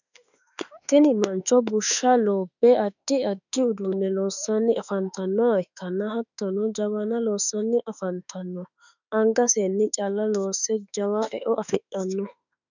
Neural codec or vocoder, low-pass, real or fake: autoencoder, 48 kHz, 32 numbers a frame, DAC-VAE, trained on Japanese speech; 7.2 kHz; fake